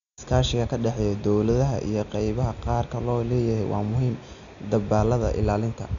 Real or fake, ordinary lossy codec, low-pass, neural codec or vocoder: real; none; 7.2 kHz; none